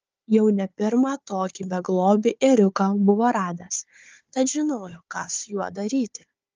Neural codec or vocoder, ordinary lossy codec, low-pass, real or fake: codec, 16 kHz, 4 kbps, FunCodec, trained on Chinese and English, 50 frames a second; Opus, 24 kbps; 7.2 kHz; fake